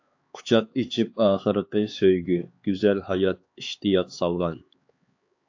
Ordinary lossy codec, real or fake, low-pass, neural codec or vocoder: AAC, 48 kbps; fake; 7.2 kHz; codec, 16 kHz, 4 kbps, X-Codec, HuBERT features, trained on LibriSpeech